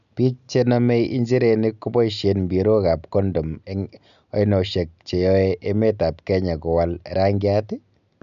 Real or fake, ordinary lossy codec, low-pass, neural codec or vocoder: real; none; 7.2 kHz; none